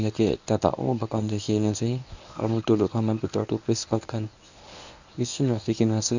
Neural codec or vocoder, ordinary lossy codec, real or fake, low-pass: codec, 24 kHz, 0.9 kbps, WavTokenizer, medium speech release version 1; none; fake; 7.2 kHz